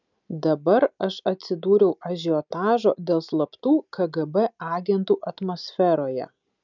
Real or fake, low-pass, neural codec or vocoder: real; 7.2 kHz; none